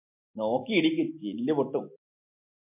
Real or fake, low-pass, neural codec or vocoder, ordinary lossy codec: real; 3.6 kHz; none; MP3, 32 kbps